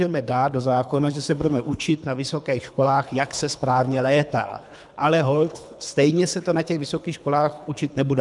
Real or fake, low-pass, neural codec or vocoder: fake; 10.8 kHz; codec, 24 kHz, 3 kbps, HILCodec